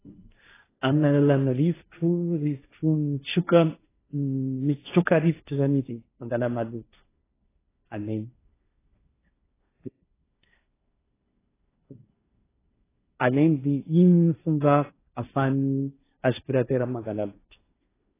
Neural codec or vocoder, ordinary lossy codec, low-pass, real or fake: codec, 16 kHz, 1.1 kbps, Voila-Tokenizer; AAC, 16 kbps; 3.6 kHz; fake